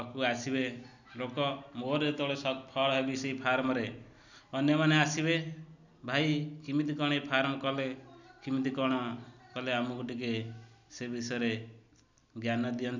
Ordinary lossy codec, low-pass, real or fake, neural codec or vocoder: none; 7.2 kHz; real; none